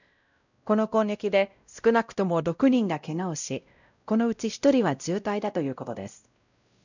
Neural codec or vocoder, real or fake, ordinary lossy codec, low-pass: codec, 16 kHz, 0.5 kbps, X-Codec, WavLM features, trained on Multilingual LibriSpeech; fake; none; 7.2 kHz